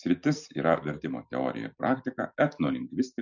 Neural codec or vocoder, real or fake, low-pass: none; real; 7.2 kHz